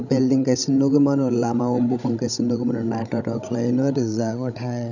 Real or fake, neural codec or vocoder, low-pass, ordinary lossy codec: fake; codec, 16 kHz, 16 kbps, FreqCodec, larger model; 7.2 kHz; none